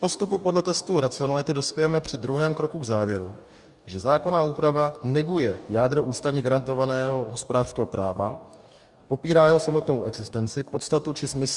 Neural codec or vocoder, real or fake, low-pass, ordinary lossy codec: codec, 44.1 kHz, 2.6 kbps, DAC; fake; 10.8 kHz; Opus, 64 kbps